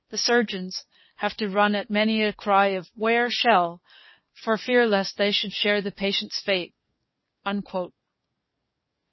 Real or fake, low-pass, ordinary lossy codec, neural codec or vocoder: fake; 7.2 kHz; MP3, 24 kbps; codec, 44.1 kHz, 7.8 kbps, DAC